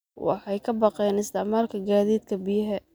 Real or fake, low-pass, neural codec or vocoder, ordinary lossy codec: real; none; none; none